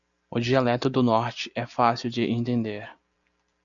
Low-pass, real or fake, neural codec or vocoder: 7.2 kHz; real; none